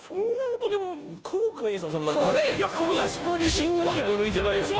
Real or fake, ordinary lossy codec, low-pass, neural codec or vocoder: fake; none; none; codec, 16 kHz, 0.5 kbps, FunCodec, trained on Chinese and English, 25 frames a second